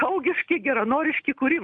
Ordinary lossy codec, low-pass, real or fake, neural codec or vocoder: MP3, 96 kbps; 9.9 kHz; real; none